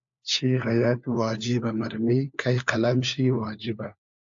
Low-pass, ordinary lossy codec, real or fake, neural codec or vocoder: 7.2 kHz; AAC, 64 kbps; fake; codec, 16 kHz, 4 kbps, FunCodec, trained on LibriTTS, 50 frames a second